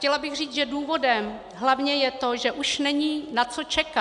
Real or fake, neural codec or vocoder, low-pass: real; none; 10.8 kHz